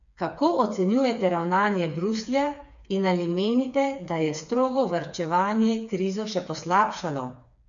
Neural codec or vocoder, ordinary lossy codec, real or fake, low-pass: codec, 16 kHz, 4 kbps, FreqCodec, smaller model; none; fake; 7.2 kHz